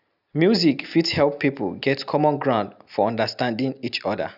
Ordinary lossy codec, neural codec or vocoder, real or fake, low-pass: none; none; real; 5.4 kHz